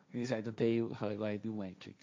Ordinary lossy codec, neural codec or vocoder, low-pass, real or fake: none; codec, 16 kHz, 1.1 kbps, Voila-Tokenizer; none; fake